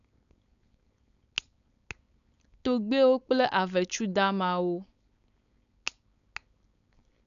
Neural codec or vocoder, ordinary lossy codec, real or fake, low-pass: codec, 16 kHz, 4.8 kbps, FACodec; none; fake; 7.2 kHz